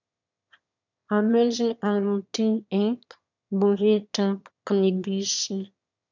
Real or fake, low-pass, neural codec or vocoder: fake; 7.2 kHz; autoencoder, 22.05 kHz, a latent of 192 numbers a frame, VITS, trained on one speaker